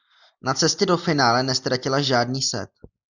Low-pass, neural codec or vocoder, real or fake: 7.2 kHz; none; real